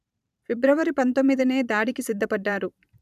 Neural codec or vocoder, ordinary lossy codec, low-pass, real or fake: vocoder, 44.1 kHz, 128 mel bands every 512 samples, BigVGAN v2; none; 14.4 kHz; fake